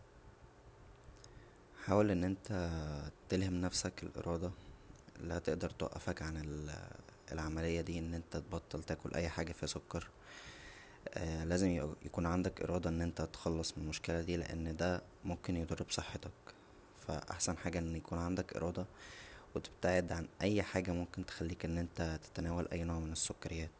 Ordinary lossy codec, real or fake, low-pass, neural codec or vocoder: none; real; none; none